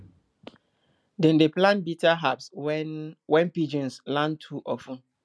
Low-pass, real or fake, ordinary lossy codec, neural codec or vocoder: none; real; none; none